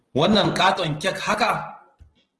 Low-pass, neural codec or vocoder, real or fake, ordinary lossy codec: 10.8 kHz; none; real; Opus, 16 kbps